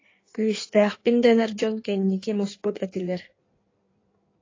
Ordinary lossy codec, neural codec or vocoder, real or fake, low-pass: AAC, 32 kbps; codec, 16 kHz in and 24 kHz out, 1.1 kbps, FireRedTTS-2 codec; fake; 7.2 kHz